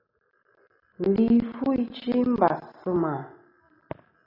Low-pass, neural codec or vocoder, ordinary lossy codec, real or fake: 5.4 kHz; none; AAC, 24 kbps; real